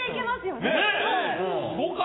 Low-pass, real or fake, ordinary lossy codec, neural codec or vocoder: 7.2 kHz; real; AAC, 16 kbps; none